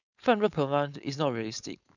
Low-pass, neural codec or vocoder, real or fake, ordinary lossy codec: 7.2 kHz; codec, 16 kHz, 4.8 kbps, FACodec; fake; none